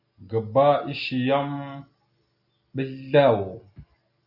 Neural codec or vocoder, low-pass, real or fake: none; 5.4 kHz; real